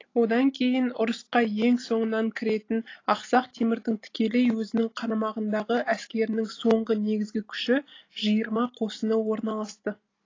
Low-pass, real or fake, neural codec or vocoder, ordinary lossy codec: 7.2 kHz; real; none; AAC, 32 kbps